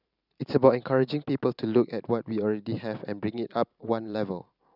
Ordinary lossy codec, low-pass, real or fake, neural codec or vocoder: none; 5.4 kHz; fake; vocoder, 44.1 kHz, 128 mel bands every 512 samples, BigVGAN v2